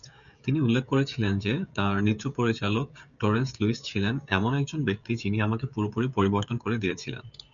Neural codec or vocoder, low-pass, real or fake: codec, 16 kHz, 16 kbps, FreqCodec, smaller model; 7.2 kHz; fake